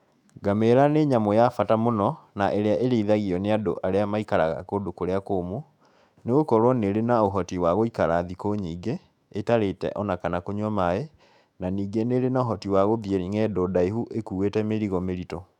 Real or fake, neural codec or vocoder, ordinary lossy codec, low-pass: fake; autoencoder, 48 kHz, 128 numbers a frame, DAC-VAE, trained on Japanese speech; none; 19.8 kHz